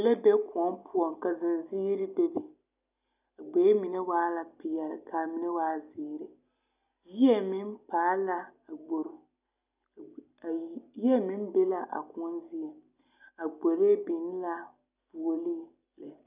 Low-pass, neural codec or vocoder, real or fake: 3.6 kHz; none; real